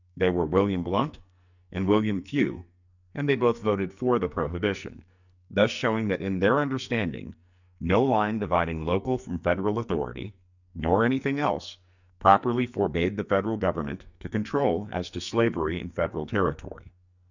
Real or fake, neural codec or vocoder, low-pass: fake; codec, 44.1 kHz, 2.6 kbps, SNAC; 7.2 kHz